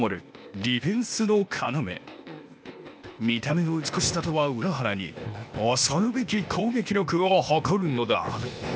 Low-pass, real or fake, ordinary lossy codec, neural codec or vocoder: none; fake; none; codec, 16 kHz, 0.8 kbps, ZipCodec